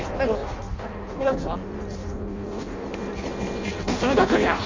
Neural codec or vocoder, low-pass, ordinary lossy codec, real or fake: codec, 16 kHz in and 24 kHz out, 0.6 kbps, FireRedTTS-2 codec; 7.2 kHz; MP3, 64 kbps; fake